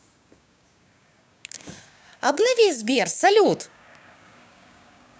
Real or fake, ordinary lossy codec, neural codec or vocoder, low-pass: fake; none; codec, 16 kHz, 6 kbps, DAC; none